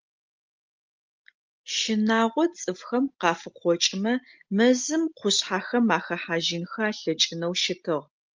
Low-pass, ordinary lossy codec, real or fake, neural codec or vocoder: 7.2 kHz; Opus, 32 kbps; real; none